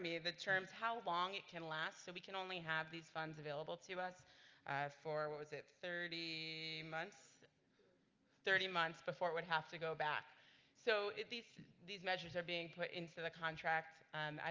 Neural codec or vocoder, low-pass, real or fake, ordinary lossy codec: none; 7.2 kHz; real; Opus, 32 kbps